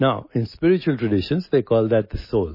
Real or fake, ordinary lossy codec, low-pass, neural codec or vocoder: real; MP3, 24 kbps; 5.4 kHz; none